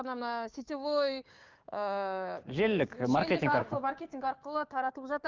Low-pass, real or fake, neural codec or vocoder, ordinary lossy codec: 7.2 kHz; fake; codec, 16 kHz, 6 kbps, DAC; Opus, 24 kbps